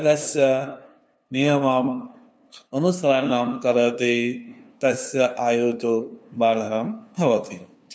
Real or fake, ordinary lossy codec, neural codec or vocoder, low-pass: fake; none; codec, 16 kHz, 2 kbps, FunCodec, trained on LibriTTS, 25 frames a second; none